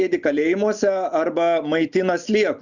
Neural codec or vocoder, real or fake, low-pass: none; real; 7.2 kHz